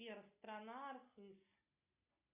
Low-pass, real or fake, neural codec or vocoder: 3.6 kHz; real; none